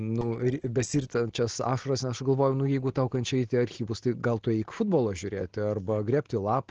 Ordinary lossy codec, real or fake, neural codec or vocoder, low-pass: Opus, 16 kbps; real; none; 7.2 kHz